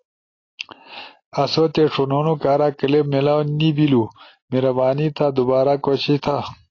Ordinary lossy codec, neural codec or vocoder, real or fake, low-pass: AAC, 32 kbps; none; real; 7.2 kHz